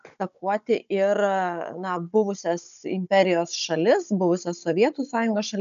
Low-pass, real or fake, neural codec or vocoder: 7.2 kHz; fake; codec, 16 kHz, 16 kbps, FunCodec, trained on Chinese and English, 50 frames a second